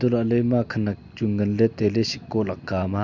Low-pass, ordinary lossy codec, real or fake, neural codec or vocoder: 7.2 kHz; none; real; none